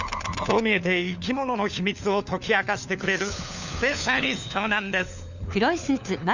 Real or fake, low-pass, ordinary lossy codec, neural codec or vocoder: fake; 7.2 kHz; none; codec, 16 kHz, 4 kbps, FunCodec, trained on LibriTTS, 50 frames a second